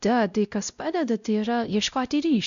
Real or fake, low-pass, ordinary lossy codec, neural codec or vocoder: fake; 7.2 kHz; MP3, 96 kbps; codec, 16 kHz, 0.5 kbps, X-Codec, WavLM features, trained on Multilingual LibriSpeech